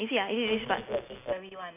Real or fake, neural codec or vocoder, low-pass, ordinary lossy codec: real; none; 3.6 kHz; none